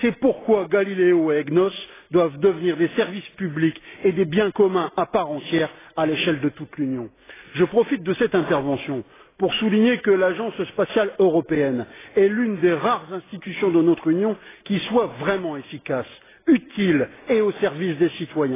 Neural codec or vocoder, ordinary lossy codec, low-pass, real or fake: none; AAC, 16 kbps; 3.6 kHz; real